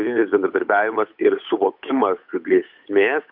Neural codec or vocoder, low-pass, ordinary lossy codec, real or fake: codec, 16 kHz, 8 kbps, FunCodec, trained on Chinese and English, 25 frames a second; 5.4 kHz; MP3, 48 kbps; fake